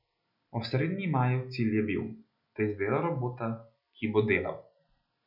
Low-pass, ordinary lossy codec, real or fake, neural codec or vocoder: 5.4 kHz; none; real; none